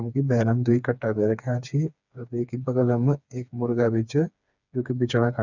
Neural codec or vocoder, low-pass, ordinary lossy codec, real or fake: codec, 16 kHz, 4 kbps, FreqCodec, smaller model; 7.2 kHz; none; fake